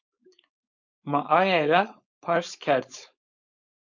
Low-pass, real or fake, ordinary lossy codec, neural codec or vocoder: 7.2 kHz; fake; MP3, 48 kbps; codec, 16 kHz, 4.8 kbps, FACodec